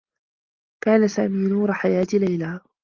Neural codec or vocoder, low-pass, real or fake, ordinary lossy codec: none; 7.2 kHz; real; Opus, 24 kbps